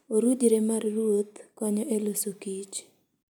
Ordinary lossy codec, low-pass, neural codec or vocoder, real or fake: none; none; none; real